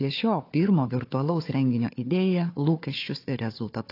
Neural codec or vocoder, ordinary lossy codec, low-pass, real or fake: codec, 16 kHz, 4 kbps, FunCodec, trained on Chinese and English, 50 frames a second; AAC, 32 kbps; 5.4 kHz; fake